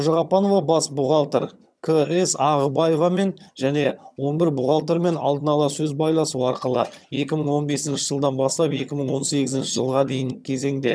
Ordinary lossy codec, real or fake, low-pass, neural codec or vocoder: none; fake; none; vocoder, 22.05 kHz, 80 mel bands, HiFi-GAN